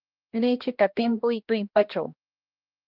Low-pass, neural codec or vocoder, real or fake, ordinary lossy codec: 5.4 kHz; codec, 16 kHz, 1 kbps, X-Codec, HuBERT features, trained on balanced general audio; fake; Opus, 24 kbps